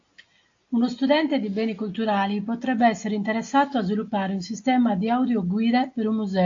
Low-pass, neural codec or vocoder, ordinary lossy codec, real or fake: 7.2 kHz; none; AAC, 48 kbps; real